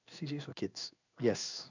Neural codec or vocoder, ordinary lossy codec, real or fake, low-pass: codec, 16 kHz in and 24 kHz out, 1 kbps, XY-Tokenizer; none; fake; 7.2 kHz